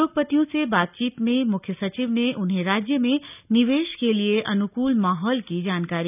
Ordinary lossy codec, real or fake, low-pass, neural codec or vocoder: none; real; 3.6 kHz; none